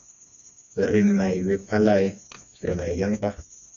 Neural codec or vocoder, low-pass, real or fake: codec, 16 kHz, 2 kbps, FreqCodec, smaller model; 7.2 kHz; fake